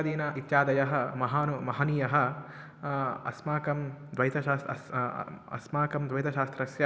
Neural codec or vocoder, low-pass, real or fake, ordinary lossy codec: none; none; real; none